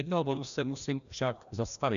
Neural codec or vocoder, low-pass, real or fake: codec, 16 kHz, 1 kbps, FreqCodec, larger model; 7.2 kHz; fake